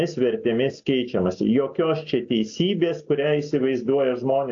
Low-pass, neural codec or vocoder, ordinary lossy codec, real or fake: 7.2 kHz; none; AAC, 48 kbps; real